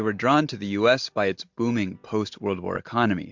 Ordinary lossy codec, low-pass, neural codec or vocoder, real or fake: MP3, 64 kbps; 7.2 kHz; none; real